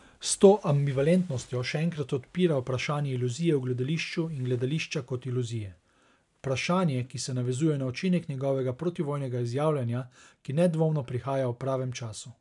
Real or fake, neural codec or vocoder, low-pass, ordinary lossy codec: real; none; 10.8 kHz; none